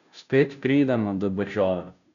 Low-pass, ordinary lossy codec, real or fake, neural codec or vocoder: 7.2 kHz; none; fake; codec, 16 kHz, 0.5 kbps, FunCodec, trained on Chinese and English, 25 frames a second